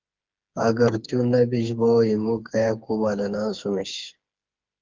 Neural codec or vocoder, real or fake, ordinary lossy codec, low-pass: codec, 16 kHz, 4 kbps, FreqCodec, smaller model; fake; Opus, 32 kbps; 7.2 kHz